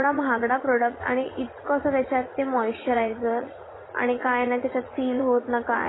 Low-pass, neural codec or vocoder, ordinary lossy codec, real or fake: 7.2 kHz; vocoder, 44.1 kHz, 80 mel bands, Vocos; AAC, 16 kbps; fake